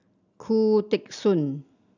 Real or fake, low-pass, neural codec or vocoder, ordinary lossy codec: real; 7.2 kHz; none; none